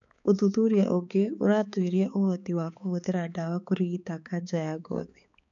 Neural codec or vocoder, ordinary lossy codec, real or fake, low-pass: codec, 16 kHz, 4 kbps, X-Codec, HuBERT features, trained on balanced general audio; none; fake; 7.2 kHz